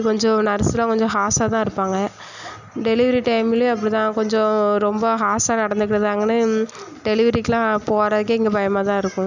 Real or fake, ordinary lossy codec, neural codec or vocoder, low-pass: real; none; none; 7.2 kHz